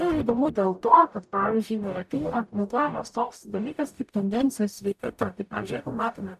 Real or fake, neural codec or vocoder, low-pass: fake; codec, 44.1 kHz, 0.9 kbps, DAC; 14.4 kHz